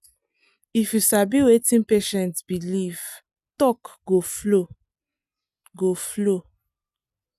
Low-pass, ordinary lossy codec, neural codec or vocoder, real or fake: 14.4 kHz; none; none; real